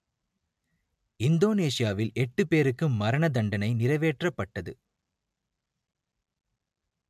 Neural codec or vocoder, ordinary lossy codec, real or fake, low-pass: none; MP3, 96 kbps; real; 14.4 kHz